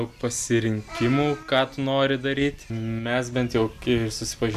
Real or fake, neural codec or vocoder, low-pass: real; none; 14.4 kHz